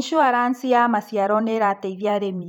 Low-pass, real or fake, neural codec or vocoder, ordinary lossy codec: 19.8 kHz; fake; vocoder, 44.1 kHz, 128 mel bands every 512 samples, BigVGAN v2; none